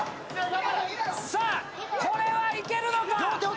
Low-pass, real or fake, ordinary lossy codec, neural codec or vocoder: none; real; none; none